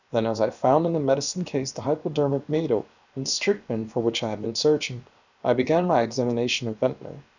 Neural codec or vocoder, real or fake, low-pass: codec, 16 kHz, about 1 kbps, DyCAST, with the encoder's durations; fake; 7.2 kHz